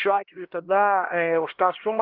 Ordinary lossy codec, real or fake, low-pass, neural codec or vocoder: Opus, 16 kbps; fake; 5.4 kHz; codec, 16 kHz, 1 kbps, X-Codec, HuBERT features, trained on LibriSpeech